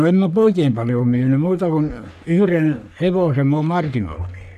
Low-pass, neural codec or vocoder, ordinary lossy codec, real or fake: 14.4 kHz; codec, 44.1 kHz, 3.4 kbps, Pupu-Codec; none; fake